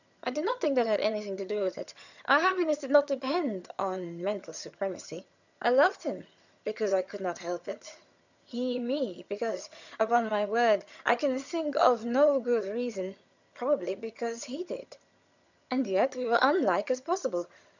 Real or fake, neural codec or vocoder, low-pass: fake; vocoder, 22.05 kHz, 80 mel bands, HiFi-GAN; 7.2 kHz